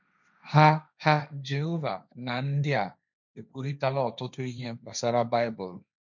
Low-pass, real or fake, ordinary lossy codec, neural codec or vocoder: 7.2 kHz; fake; none; codec, 16 kHz, 1.1 kbps, Voila-Tokenizer